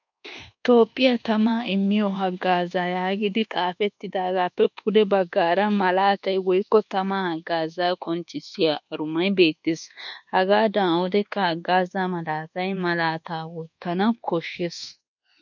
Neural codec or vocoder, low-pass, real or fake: codec, 24 kHz, 1.2 kbps, DualCodec; 7.2 kHz; fake